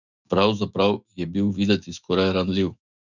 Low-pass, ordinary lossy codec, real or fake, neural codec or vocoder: 7.2 kHz; none; fake; autoencoder, 48 kHz, 128 numbers a frame, DAC-VAE, trained on Japanese speech